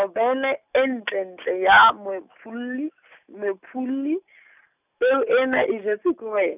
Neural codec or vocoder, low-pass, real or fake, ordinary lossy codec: none; 3.6 kHz; real; none